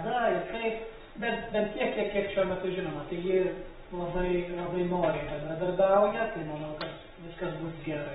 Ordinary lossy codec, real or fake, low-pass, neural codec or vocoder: AAC, 16 kbps; real; 7.2 kHz; none